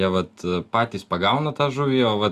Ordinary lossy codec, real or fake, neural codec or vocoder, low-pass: Opus, 64 kbps; real; none; 14.4 kHz